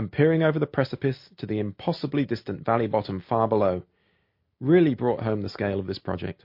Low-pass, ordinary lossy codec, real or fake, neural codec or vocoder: 5.4 kHz; MP3, 32 kbps; real; none